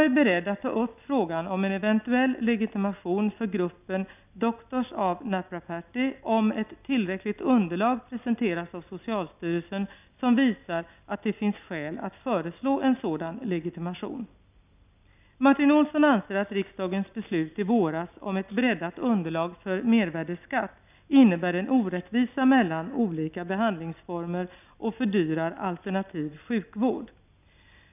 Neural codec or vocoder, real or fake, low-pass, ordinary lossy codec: none; real; 3.6 kHz; none